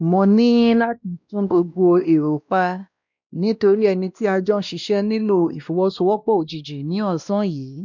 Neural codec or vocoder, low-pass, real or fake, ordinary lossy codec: codec, 16 kHz, 1 kbps, X-Codec, WavLM features, trained on Multilingual LibriSpeech; 7.2 kHz; fake; none